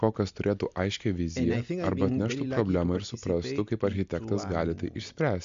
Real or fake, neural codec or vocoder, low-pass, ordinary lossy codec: real; none; 7.2 kHz; MP3, 64 kbps